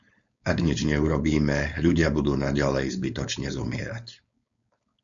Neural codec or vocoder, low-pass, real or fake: codec, 16 kHz, 4.8 kbps, FACodec; 7.2 kHz; fake